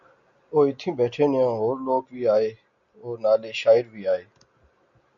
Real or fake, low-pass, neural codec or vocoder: real; 7.2 kHz; none